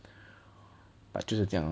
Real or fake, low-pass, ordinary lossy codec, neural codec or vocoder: real; none; none; none